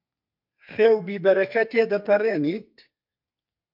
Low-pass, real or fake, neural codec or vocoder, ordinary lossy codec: 5.4 kHz; fake; codec, 32 kHz, 1.9 kbps, SNAC; MP3, 48 kbps